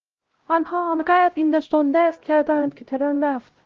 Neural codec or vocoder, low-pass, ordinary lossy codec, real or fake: codec, 16 kHz, 0.5 kbps, X-Codec, HuBERT features, trained on LibriSpeech; 7.2 kHz; Opus, 16 kbps; fake